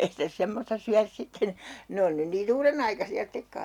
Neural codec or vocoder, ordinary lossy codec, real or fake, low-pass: none; none; real; 19.8 kHz